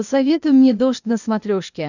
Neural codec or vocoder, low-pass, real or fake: codec, 16 kHz, 0.8 kbps, ZipCodec; 7.2 kHz; fake